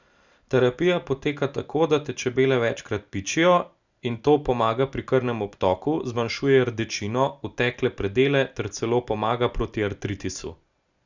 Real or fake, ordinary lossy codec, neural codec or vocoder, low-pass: real; none; none; 7.2 kHz